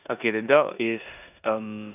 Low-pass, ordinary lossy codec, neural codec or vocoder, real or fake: 3.6 kHz; none; codec, 16 kHz in and 24 kHz out, 0.9 kbps, LongCat-Audio-Codec, four codebook decoder; fake